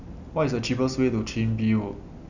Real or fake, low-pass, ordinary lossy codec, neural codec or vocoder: real; 7.2 kHz; none; none